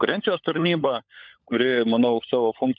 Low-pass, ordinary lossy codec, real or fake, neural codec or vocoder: 7.2 kHz; MP3, 64 kbps; fake; codec, 16 kHz, 16 kbps, FunCodec, trained on LibriTTS, 50 frames a second